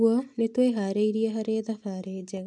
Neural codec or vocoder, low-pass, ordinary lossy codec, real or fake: none; 10.8 kHz; none; real